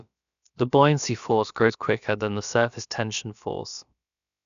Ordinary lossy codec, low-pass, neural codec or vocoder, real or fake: none; 7.2 kHz; codec, 16 kHz, about 1 kbps, DyCAST, with the encoder's durations; fake